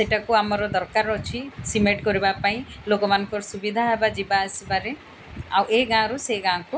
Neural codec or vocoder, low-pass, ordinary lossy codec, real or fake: none; none; none; real